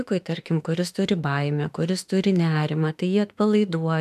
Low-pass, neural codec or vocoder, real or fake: 14.4 kHz; autoencoder, 48 kHz, 32 numbers a frame, DAC-VAE, trained on Japanese speech; fake